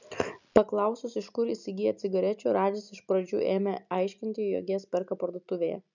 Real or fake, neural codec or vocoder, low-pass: real; none; 7.2 kHz